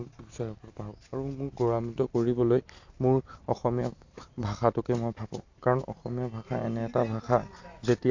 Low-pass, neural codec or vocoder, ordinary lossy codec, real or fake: 7.2 kHz; none; AAC, 48 kbps; real